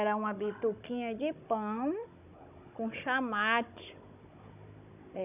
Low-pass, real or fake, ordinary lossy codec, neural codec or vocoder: 3.6 kHz; fake; none; codec, 16 kHz, 16 kbps, FunCodec, trained on Chinese and English, 50 frames a second